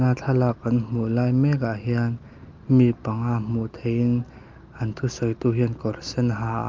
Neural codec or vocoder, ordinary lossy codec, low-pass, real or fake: none; Opus, 24 kbps; 7.2 kHz; real